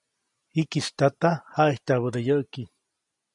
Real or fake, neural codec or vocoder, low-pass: real; none; 10.8 kHz